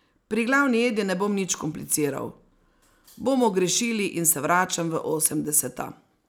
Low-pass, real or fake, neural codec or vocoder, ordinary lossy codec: none; real; none; none